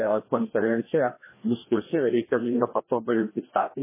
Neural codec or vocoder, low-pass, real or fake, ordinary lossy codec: codec, 16 kHz, 1 kbps, FreqCodec, larger model; 3.6 kHz; fake; MP3, 16 kbps